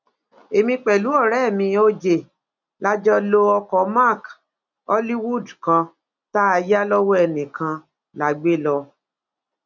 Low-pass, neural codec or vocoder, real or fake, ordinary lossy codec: 7.2 kHz; none; real; none